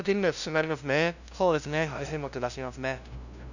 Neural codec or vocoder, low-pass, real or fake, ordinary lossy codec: codec, 16 kHz, 0.5 kbps, FunCodec, trained on LibriTTS, 25 frames a second; 7.2 kHz; fake; none